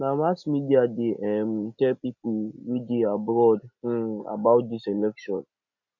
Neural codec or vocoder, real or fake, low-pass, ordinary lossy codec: none; real; 7.2 kHz; none